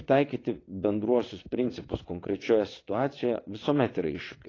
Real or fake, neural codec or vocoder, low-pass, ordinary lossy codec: fake; vocoder, 22.05 kHz, 80 mel bands, WaveNeXt; 7.2 kHz; AAC, 32 kbps